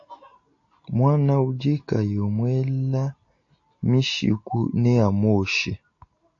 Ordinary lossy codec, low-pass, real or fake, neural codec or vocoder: AAC, 48 kbps; 7.2 kHz; real; none